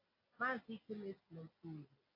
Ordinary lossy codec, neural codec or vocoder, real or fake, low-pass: AAC, 32 kbps; none; real; 5.4 kHz